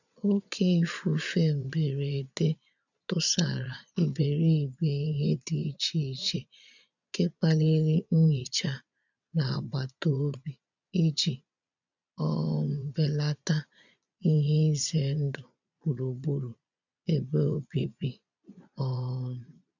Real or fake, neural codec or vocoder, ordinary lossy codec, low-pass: fake; vocoder, 22.05 kHz, 80 mel bands, Vocos; none; 7.2 kHz